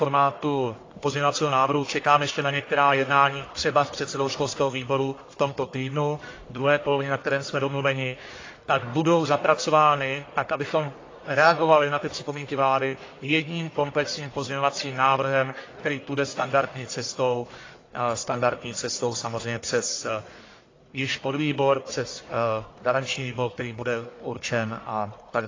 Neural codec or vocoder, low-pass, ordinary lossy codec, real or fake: codec, 44.1 kHz, 1.7 kbps, Pupu-Codec; 7.2 kHz; AAC, 32 kbps; fake